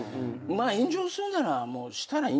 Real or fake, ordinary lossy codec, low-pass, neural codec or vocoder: real; none; none; none